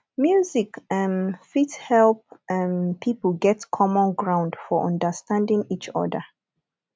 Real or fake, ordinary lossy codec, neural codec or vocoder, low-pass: real; none; none; none